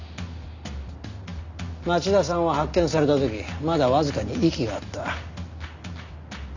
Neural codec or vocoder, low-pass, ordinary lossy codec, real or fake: none; 7.2 kHz; none; real